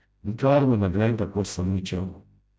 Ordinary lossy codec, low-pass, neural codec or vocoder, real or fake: none; none; codec, 16 kHz, 0.5 kbps, FreqCodec, smaller model; fake